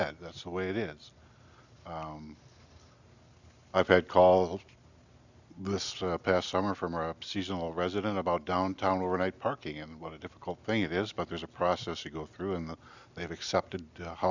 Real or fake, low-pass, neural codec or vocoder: real; 7.2 kHz; none